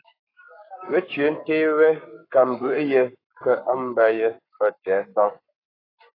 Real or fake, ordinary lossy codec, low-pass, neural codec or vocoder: fake; AAC, 24 kbps; 5.4 kHz; codec, 44.1 kHz, 7.8 kbps, Pupu-Codec